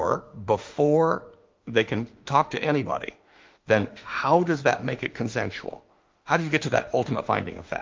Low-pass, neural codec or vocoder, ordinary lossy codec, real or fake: 7.2 kHz; autoencoder, 48 kHz, 32 numbers a frame, DAC-VAE, trained on Japanese speech; Opus, 24 kbps; fake